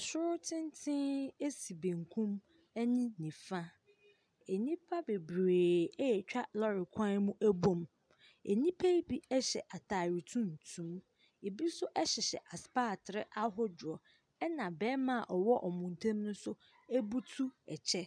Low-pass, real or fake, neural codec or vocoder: 9.9 kHz; real; none